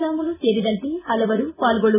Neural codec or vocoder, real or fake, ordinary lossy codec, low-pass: none; real; none; 3.6 kHz